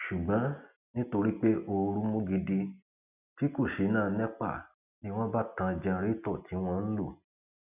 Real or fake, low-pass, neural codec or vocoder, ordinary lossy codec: real; 3.6 kHz; none; none